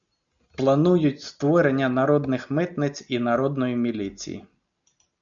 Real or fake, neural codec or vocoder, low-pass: real; none; 7.2 kHz